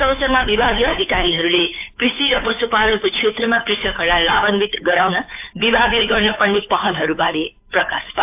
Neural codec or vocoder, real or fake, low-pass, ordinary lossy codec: codec, 16 kHz, 2 kbps, FunCodec, trained on Chinese and English, 25 frames a second; fake; 3.6 kHz; AAC, 32 kbps